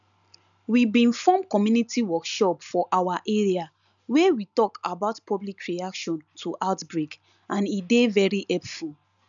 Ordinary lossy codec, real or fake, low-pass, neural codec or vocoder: none; real; 7.2 kHz; none